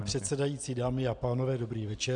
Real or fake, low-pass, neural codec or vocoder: real; 9.9 kHz; none